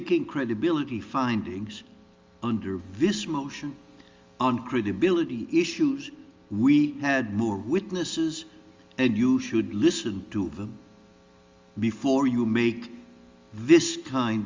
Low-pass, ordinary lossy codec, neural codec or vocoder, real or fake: 7.2 kHz; Opus, 32 kbps; none; real